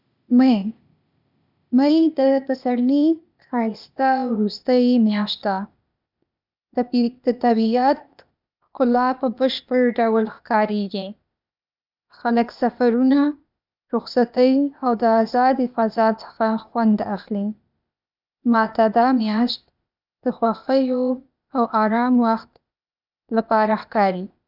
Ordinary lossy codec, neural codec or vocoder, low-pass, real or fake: none; codec, 16 kHz, 0.8 kbps, ZipCodec; 5.4 kHz; fake